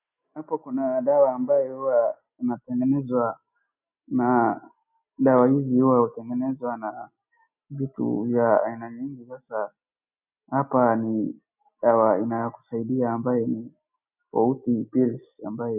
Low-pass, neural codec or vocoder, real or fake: 3.6 kHz; none; real